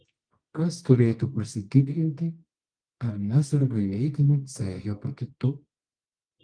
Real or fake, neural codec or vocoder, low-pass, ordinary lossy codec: fake; codec, 24 kHz, 0.9 kbps, WavTokenizer, medium music audio release; 9.9 kHz; Opus, 24 kbps